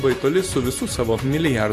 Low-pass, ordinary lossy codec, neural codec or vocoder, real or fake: 14.4 kHz; AAC, 64 kbps; vocoder, 44.1 kHz, 128 mel bands every 512 samples, BigVGAN v2; fake